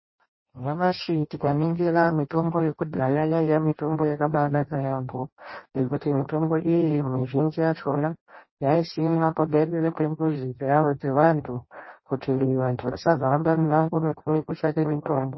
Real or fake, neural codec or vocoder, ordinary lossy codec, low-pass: fake; codec, 16 kHz in and 24 kHz out, 0.6 kbps, FireRedTTS-2 codec; MP3, 24 kbps; 7.2 kHz